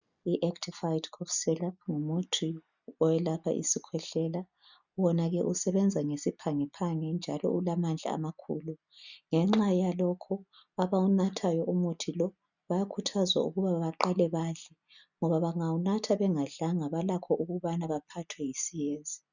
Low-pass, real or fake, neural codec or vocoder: 7.2 kHz; real; none